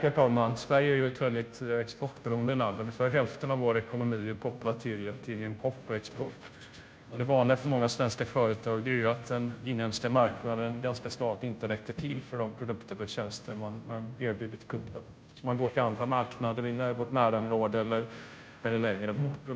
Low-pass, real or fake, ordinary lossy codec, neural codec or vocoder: none; fake; none; codec, 16 kHz, 0.5 kbps, FunCodec, trained on Chinese and English, 25 frames a second